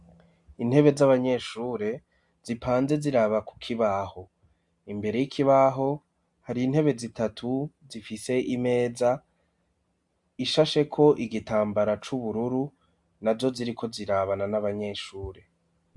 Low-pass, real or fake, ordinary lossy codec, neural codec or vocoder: 10.8 kHz; real; MP3, 64 kbps; none